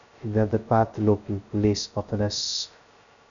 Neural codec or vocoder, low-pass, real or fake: codec, 16 kHz, 0.2 kbps, FocalCodec; 7.2 kHz; fake